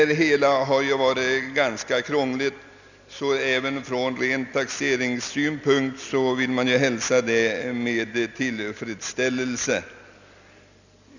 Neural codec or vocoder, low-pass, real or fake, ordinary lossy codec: none; 7.2 kHz; real; none